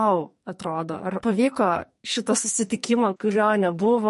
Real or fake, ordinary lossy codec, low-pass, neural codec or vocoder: fake; MP3, 48 kbps; 14.4 kHz; codec, 44.1 kHz, 2.6 kbps, SNAC